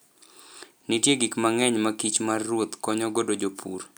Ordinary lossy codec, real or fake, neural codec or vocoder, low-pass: none; real; none; none